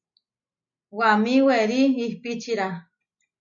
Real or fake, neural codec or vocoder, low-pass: real; none; 7.2 kHz